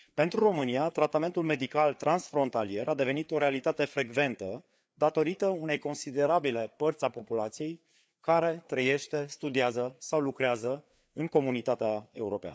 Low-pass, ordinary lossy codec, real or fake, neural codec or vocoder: none; none; fake; codec, 16 kHz, 4 kbps, FreqCodec, larger model